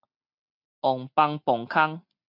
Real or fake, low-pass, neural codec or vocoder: real; 5.4 kHz; none